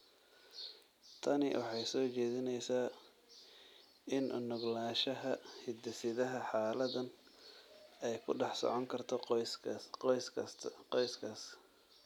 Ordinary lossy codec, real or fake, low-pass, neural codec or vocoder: none; real; 19.8 kHz; none